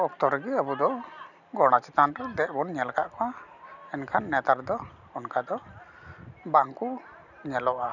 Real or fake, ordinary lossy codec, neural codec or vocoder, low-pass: real; none; none; 7.2 kHz